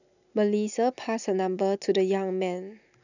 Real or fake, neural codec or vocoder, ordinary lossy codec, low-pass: real; none; none; 7.2 kHz